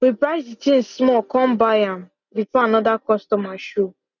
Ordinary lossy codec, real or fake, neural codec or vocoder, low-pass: none; real; none; 7.2 kHz